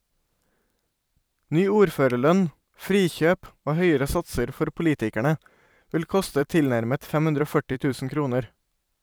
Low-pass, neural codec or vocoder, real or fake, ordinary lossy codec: none; vocoder, 44.1 kHz, 128 mel bands every 512 samples, BigVGAN v2; fake; none